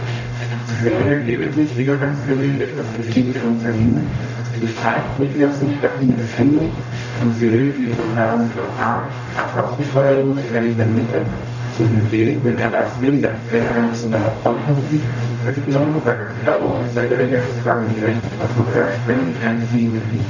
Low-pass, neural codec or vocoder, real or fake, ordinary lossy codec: 7.2 kHz; codec, 44.1 kHz, 0.9 kbps, DAC; fake; none